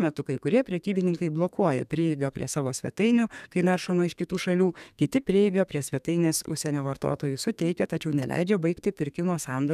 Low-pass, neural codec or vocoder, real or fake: 14.4 kHz; codec, 32 kHz, 1.9 kbps, SNAC; fake